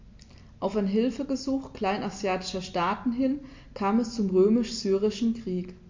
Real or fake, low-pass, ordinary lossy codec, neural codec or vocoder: real; 7.2 kHz; MP3, 48 kbps; none